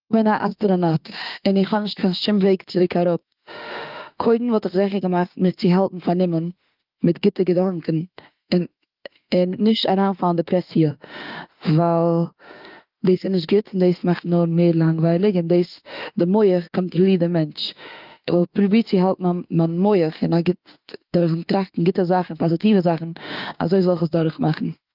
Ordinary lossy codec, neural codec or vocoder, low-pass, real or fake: Opus, 32 kbps; autoencoder, 48 kHz, 32 numbers a frame, DAC-VAE, trained on Japanese speech; 5.4 kHz; fake